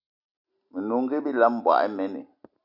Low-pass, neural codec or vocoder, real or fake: 5.4 kHz; none; real